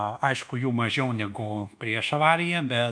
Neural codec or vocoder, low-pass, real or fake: codec, 24 kHz, 1.2 kbps, DualCodec; 9.9 kHz; fake